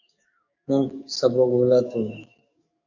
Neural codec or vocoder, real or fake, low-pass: codec, 44.1 kHz, 7.8 kbps, DAC; fake; 7.2 kHz